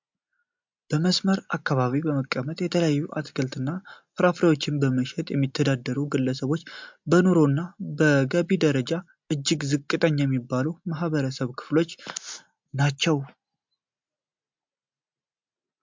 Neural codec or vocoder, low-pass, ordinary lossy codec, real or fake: none; 7.2 kHz; MP3, 64 kbps; real